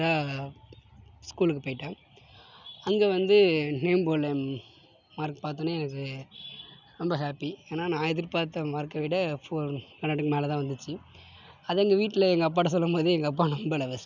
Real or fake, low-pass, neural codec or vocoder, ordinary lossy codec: real; 7.2 kHz; none; none